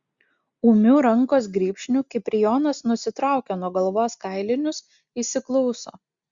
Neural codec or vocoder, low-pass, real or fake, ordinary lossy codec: none; 7.2 kHz; real; Opus, 64 kbps